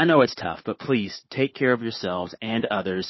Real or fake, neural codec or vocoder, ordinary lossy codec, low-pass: fake; codec, 16 kHz, 4 kbps, FunCodec, trained on Chinese and English, 50 frames a second; MP3, 24 kbps; 7.2 kHz